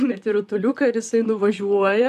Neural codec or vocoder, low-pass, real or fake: vocoder, 44.1 kHz, 128 mel bands, Pupu-Vocoder; 14.4 kHz; fake